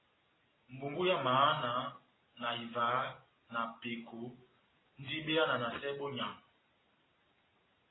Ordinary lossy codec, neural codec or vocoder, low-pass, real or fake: AAC, 16 kbps; none; 7.2 kHz; real